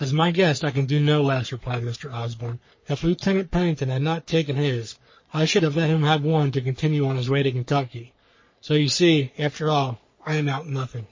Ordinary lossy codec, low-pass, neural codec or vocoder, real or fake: MP3, 32 kbps; 7.2 kHz; codec, 44.1 kHz, 3.4 kbps, Pupu-Codec; fake